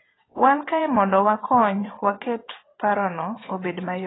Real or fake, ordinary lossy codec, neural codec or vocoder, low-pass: fake; AAC, 16 kbps; vocoder, 22.05 kHz, 80 mel bands, WaveNeXt; 7.2 kHz